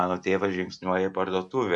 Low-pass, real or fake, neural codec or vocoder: 10.8 kHz; real; none